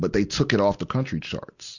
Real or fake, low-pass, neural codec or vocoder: real; 7.2 kHz; none